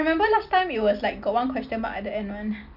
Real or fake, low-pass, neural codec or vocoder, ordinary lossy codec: real; 5.4 kHz; none; none